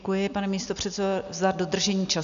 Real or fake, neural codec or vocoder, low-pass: real; none; 7.2 kHz